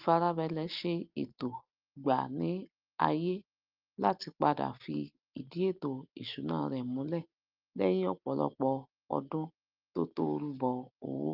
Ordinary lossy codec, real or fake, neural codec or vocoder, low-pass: Opus, 24 kbps; real; none; 5.4 kHz